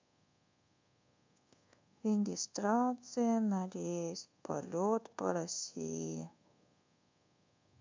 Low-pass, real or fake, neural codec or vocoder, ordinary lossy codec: 7.2 kHz; fake; codec, 24 kHz, 1.2 kbps, DualCodec; MP3, 64 kbps